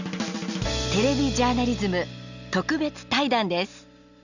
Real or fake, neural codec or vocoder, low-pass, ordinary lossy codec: real; none; 7.2 kHz; none